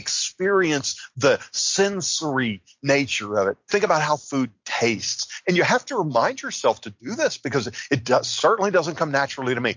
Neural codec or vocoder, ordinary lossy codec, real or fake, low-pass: none; MP3, 48 kbps; real; 7.2 kHz